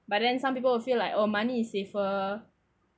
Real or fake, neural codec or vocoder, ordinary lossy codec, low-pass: real; none; none; none